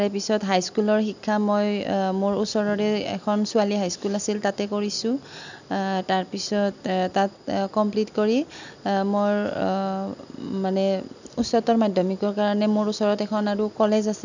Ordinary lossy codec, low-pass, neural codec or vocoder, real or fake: none; 7.2 kHz; none; real